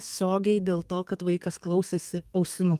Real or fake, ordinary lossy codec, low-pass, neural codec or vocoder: fake; Opus, 32 kbps; 14.4 kHz; codec, 32 kHz, 1.9 kbps, SNAC